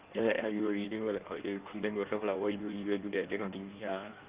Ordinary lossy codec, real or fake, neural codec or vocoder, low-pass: Opus, 32 kbps; fake; codec, 16 kHz in and 24 kHz out, 1.1 kbps, FireRedTTS-2 codec; 3.6 kHz